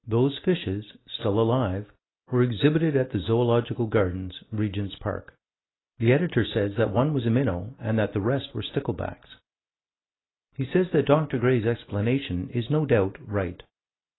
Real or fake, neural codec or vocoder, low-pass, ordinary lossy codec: real; none; 7.2 kHz; AAC, 16 kbps